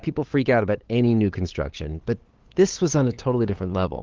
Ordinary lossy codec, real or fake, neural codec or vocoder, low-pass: Opus, 16 kbps; fake; codec, 16 kHz, 8 kbps, FunCodec, trained on LibriTTS, 25 frames a second; 7.2 kHz